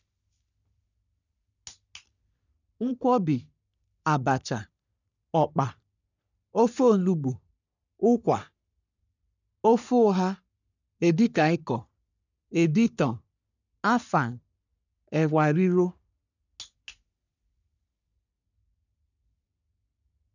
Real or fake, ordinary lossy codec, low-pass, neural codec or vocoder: fake; none; 7.2 kHz; codec, 44.1 kHz, 3.4 kbps, Pupu-Codec